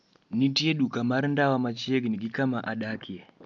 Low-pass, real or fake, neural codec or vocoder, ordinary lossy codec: 7.2 kHz; real; none; none